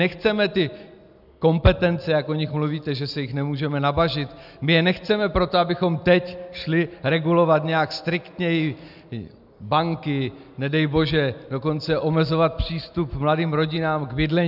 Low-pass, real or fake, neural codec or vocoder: 5.4 kHz; real; none